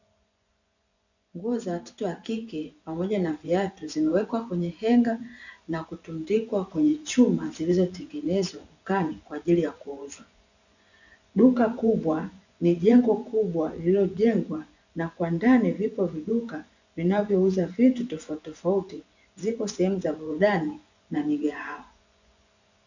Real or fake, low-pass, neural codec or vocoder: fake; 7.2 kHz; vocoder, 24 kHz, 100 mel bands, Vocos